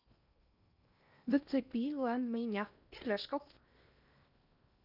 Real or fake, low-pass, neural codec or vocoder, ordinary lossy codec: fake; 5.4 kHz; codec, 16 kHz in and 24 kHz out, 0.8 kbps, FocalCodec, streaming, 65536 codes; none